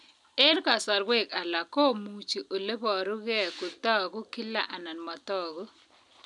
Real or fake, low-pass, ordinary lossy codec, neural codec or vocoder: real; 10.8 kHz; none; none